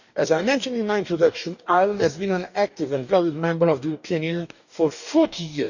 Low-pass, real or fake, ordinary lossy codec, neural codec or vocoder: 7.2 kHz; fake; none; codec, 44.1 kHz, 2.6 kbps, DAC